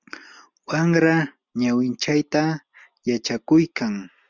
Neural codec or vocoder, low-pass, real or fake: none; 7.2 kHz; real